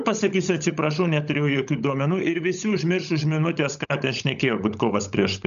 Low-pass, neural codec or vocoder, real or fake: 7.2 kHz; codec, 16 kHz, 16 kbps, FunCodec, trained on LibriTTS, 50 frames a second; fake